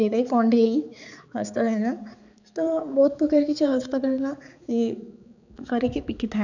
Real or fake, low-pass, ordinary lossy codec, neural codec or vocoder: fake; 7.2 kHz; none; codec, 16 kHz, 4 kbps, X-Codec, HuBERT features, trained on balanced general audio